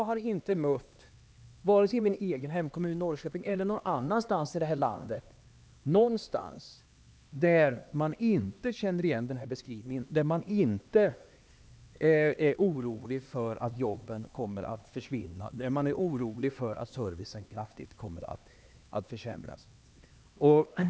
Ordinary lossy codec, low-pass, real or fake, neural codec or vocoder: none; none; fake; codec, 16 kHz, 2 kbps, X-Codec, HuBERT features, trained on LibriSpeech